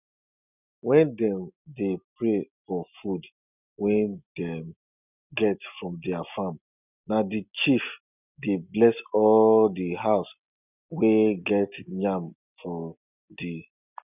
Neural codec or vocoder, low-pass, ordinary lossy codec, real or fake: none; 3.6 kHz; none; real